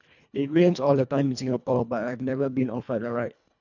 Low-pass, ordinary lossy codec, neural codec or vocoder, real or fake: 7.2 kHz; none; codec, 24 kHz, 1.5 kbps, HILCodec; fake